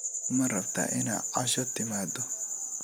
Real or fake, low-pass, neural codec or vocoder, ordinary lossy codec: real; none; none; none